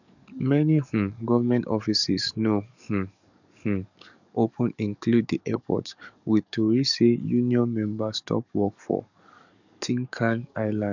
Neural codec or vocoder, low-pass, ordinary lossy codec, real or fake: codec, 44.1 kHz, 7.8 kbps, DAC; 7.2 kHz; none; fake